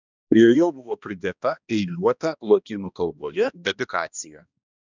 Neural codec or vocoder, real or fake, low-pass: codec, 16 kHz, 1 kbps, X-Codec, HuBERT features, trained on balanced general audio; fake; 7.2 kHz